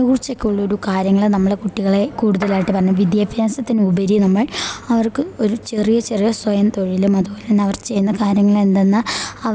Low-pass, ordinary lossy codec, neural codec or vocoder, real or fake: none; none; none; real